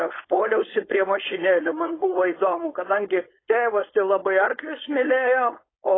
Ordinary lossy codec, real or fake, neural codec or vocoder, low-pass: AAC, 16 kbps; fake; codec, 16 kHz, 4.8 kbps, FACodec; 7.2 kHz